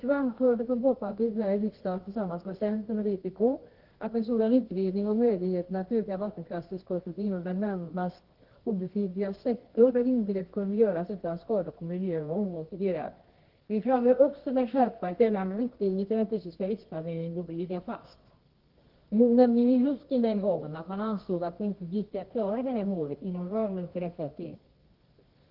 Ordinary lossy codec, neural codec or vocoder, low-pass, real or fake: Opus, 16 kbps; codec, 24 kHz, 0.9 kbps, WavTokenizer, medium music audio release; 5.4 kHz; fake